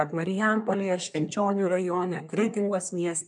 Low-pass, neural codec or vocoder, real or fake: 10.8 kHz; codec, 24 kHz, 1 kbps, SNAC; fake